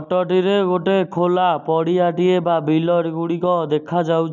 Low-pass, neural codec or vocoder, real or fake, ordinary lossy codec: 7.2 kHz; none; real; none